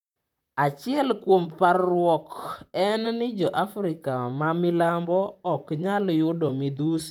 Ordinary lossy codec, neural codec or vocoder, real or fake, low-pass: none; vocoder, 44.1 kHz, 128 mel bands, Pupu-Vocoder; fake; 19.8 kHz